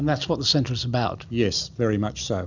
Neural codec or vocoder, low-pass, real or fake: none; 7.2 kHz; real